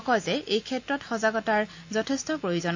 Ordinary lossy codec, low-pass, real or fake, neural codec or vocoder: AAC, 48 kbps; 7.2 kHz; real; none